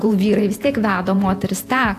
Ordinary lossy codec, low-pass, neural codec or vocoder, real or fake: AAC, 64 kbps; 14.4 kHz; vocoder, 48 kHz, 128 mel bands, Vocos; fake